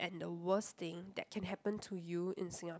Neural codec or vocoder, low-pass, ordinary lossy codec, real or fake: none; none; none; real